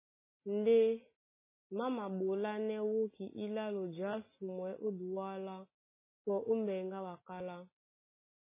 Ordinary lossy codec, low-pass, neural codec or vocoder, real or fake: MP3, 16 kbps; 3.6 kHz; none; real